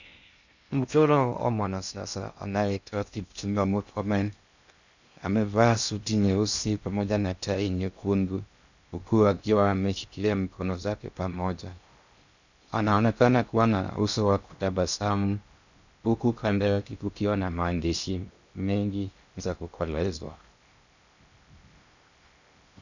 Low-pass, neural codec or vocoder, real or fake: 7.2 kHz; codec, 16 kHz in and 24 kHz out, 0.6 kbps, FocalCodec, streaming, 2048 codes; fake